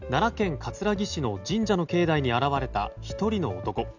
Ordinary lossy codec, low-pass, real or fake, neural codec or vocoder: none; 7.2 kHz; real; none